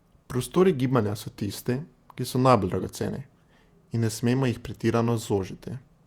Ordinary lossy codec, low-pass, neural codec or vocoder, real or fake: Opus, 64 kbps; 19.8 kHz; vocoder, 48 kHz, 128 mel bands, Vocos; fake